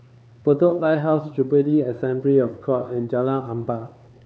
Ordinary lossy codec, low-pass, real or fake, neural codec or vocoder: none; none; fake; codec, 16 kHz, 4 kbps, X-Codec, HuBERT features, trained on LibriSpeech